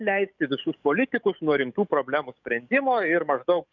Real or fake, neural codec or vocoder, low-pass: fake; codec, 44.1 kHz, 7.8 kbps, DAC; 7.2 kHz